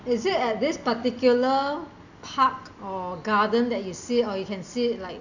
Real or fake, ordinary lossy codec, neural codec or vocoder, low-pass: real; none; none; 7.2 kHz